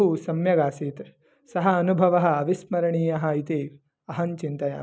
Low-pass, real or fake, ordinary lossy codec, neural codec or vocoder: none; real; none; none